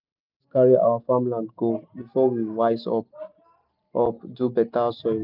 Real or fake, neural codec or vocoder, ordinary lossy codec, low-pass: real; none; none; 5.4 kHz